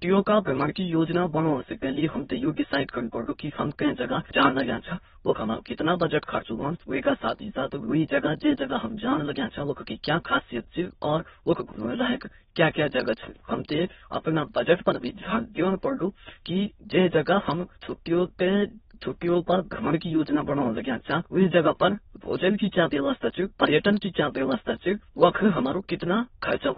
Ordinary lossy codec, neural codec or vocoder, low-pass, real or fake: AAC, 16 kbps; autoencoder, 22.05 kHz, a latent of 192 numbers a frame, VITS, trained on many speakers; 9.9 kHz; fake